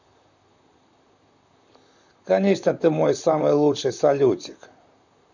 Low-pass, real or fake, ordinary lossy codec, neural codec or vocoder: 7.2 kHz; fake; none; vocoder, 44.1 kHz, 128 mel bands, Pupu-Vocoder